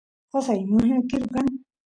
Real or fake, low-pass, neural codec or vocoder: real; 9.9 kHz; none